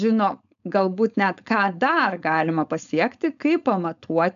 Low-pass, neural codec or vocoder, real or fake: 7.2 kHz; codec, 16 kHz, 4.8 kbps, FACodec; fake